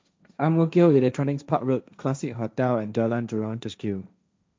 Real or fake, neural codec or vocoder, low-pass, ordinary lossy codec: fake; codec, 16 kHz, 1.1 kbps, Voila-Tokenizer; none; none